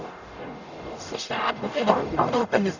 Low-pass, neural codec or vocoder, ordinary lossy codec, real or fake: 7.2 kHz; codec, 44.1 kHz, 0.9 kbps, DAC; none; fake